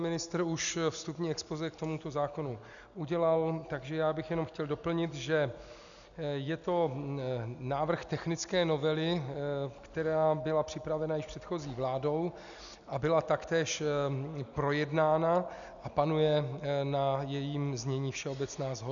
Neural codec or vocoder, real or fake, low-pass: none; real; 7.2 kHz